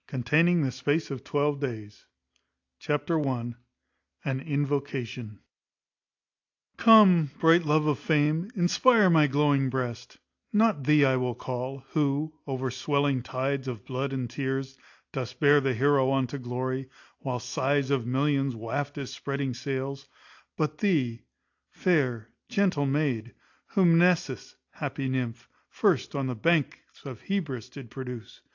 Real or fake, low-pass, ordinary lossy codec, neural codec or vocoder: real; 7.2 kHz; AAC, 48 kbps; none